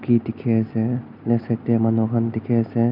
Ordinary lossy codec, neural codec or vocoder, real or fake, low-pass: none; none; real; 5.4 kHz